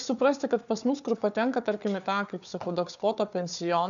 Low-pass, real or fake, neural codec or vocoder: 7.2 kHz; fake; codec, 16 kHz, 4 kbps, FunCodec, trained on Chinese and English, 50 frames a second